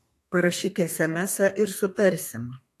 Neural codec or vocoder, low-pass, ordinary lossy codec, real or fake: codec, 32 kHz, 1.9 kbps, SNAC; 14.4 kHz; AAC, 64 kbps; fake